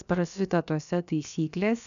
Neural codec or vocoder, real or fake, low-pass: codec, 16 kHz, about 1 kbps, DyCAST, with the encoder's durations; fake; 7.2 kHz